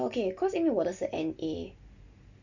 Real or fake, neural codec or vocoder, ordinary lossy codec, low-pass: real; none; none; 7.2 kHz